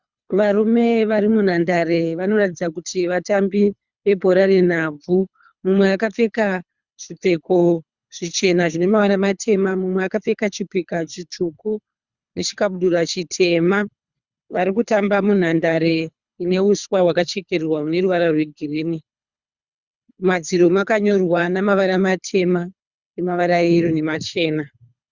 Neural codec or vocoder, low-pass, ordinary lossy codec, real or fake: codec, 24 kHz, 3 kbps, HILCodec; 7.2 kHz; Opus, 64 kbps; fake